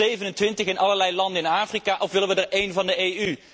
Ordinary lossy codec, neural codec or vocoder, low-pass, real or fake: none; none; none; real